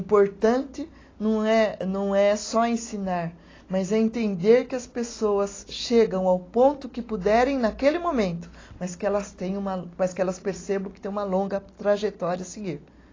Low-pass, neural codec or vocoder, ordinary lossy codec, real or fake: 7.2 kHz; none; AAC, 32 kbps; real